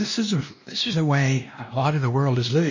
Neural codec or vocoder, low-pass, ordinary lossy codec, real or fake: codec, 16 kHz, 1 kbps, X-Codec, WavLM features, trained on Multilingual LibriSpeech; 7.2 kHz; MP3, 32 kbps; fake